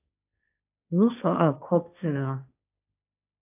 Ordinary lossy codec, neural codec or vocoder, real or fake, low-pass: AAC, 32 kbps; codec, 16 kHz, 1.1 kbps, Voila-Tokenizer; fake; 3.6 kHz